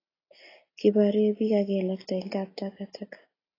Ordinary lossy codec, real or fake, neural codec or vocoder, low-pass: AAC, 24 kbps; real; none; 5.4 kHz